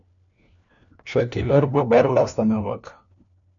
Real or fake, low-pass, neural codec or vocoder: fake; 7.2 kHz; codec, 16 kHz, 1 kbps, FunCodec, trained on LibriTTS, 50 frames a second